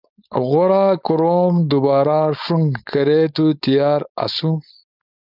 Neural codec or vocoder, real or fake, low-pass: codec, 16 kHz, 4.8 kbps, FACodec; fake; 5.4 kHz